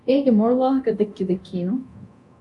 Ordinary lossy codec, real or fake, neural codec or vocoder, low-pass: MP3, 96 kbps; fake; codec, 24 kHz, 0.9 kbps, DualCodec; 10.8 kHz